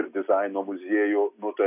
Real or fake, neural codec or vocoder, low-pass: real; none; 3.6 kHz